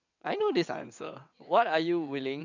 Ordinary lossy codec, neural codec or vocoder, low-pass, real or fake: none; vocoder, 22.05 kHz, 80 mel bands, WaveNeXt; 7.2 kHz; fake